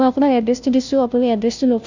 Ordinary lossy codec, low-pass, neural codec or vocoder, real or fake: none; 7.2 kHz; codec, 16 kHz, 0.5 kbps, FunCodec, trained on Chinese and English, 25 frames a second; fake